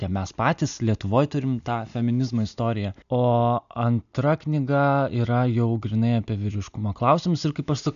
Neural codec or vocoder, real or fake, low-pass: none; real; 7.2 kHz